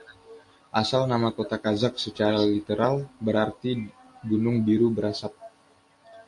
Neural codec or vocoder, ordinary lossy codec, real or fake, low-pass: none; AAC, 48 kbps; real; 10.8 kHz